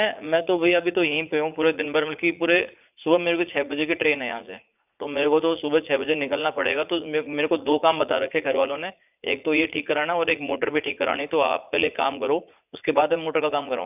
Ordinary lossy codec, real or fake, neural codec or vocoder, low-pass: none; fake; vocoder, 44.1 kHz, 80 mel bands, Vocos; 3.6 kHz